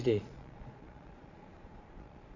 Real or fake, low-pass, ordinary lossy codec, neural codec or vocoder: fake; 7.2 kHz; none; vocoder, 22.05 kHz, 80 mel bands, Vocos